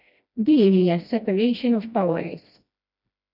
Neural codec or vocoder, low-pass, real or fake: codec, 16 kHz, 1 kbps, FreqCodec, smaller model; 5.4 kHz; fake